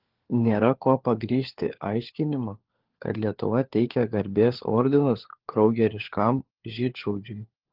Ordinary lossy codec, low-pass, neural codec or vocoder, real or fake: Opus, 16 kbps; 5.4 kHz; codec, 16 kHz, 4 kbps, FunCodec, trained on LibriTTS, 50 frames a second; fake